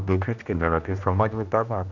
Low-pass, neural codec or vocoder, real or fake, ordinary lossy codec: 7.2 kHz; codec, 16 kHz, 1 kbps, X-Codec, HuBERT features, trained on general audio; fake; none